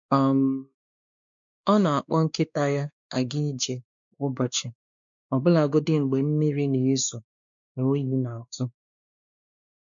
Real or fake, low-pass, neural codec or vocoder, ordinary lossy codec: fake; 7.2 kHz; codec, 16 kHz, 2 kbps, X-Codec, WavLM features, trained on Multilingual LibriSpeech; MP3, 64 kbps